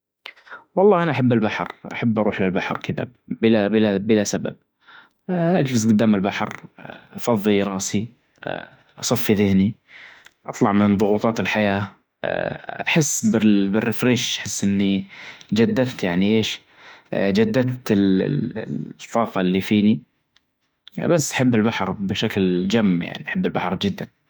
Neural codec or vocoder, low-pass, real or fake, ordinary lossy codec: autoencoder, 48 kHz, 32 numbers a frame, DAC-VAE, trained on Japanese speech; none; fake; none